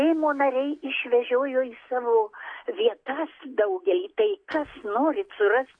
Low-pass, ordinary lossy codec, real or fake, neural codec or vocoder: 9.9 kHz; AAC, 48 kbps; real; none